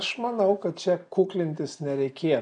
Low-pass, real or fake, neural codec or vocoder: 9.9 kHz; real; none